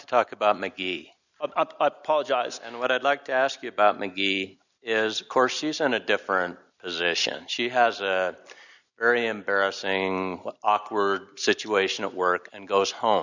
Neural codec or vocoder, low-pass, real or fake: none; 7.2 kHz; real